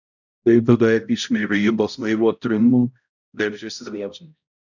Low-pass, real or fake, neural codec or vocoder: 7.2 kHz; fake; codec, 16 kHz, 0.5 kbps, X-Codec, HuBERT features, trained on balanced general audio